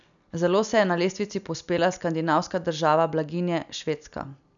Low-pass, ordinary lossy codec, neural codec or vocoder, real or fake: 7.2 kHz; none; none; real